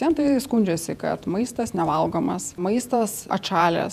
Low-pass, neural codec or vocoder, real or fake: 14.4 kHz; vocoder, 48 kHz, 128 mel bands, Vocos; fake